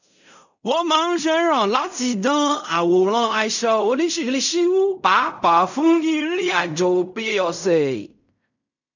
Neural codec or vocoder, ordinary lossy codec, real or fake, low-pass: codec, 16 kHz in and 24 kHz out, 0.4 kbps, LongCat-Audio-Codec, fine tuned four codebook decoder; none; fake; 7.2 kHz